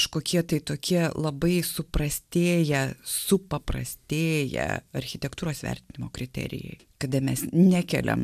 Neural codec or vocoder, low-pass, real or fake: none; 14.4 kHz; real